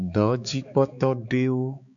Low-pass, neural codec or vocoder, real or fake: 7.2 kHz; codec, 16 kHz, 4 kbps, X-Codec, HuBERT features, trained on balanced general audio; fake